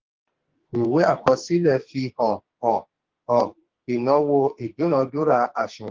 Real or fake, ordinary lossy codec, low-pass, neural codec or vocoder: fake; Opus, 16 kbps; 7.2 kHz; codec, 44.1 kHz, 2.6 kbps, SNAC